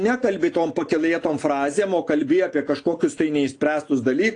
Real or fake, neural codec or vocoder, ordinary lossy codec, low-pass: real; none; AAC, 48 kbps; 9.9 kHz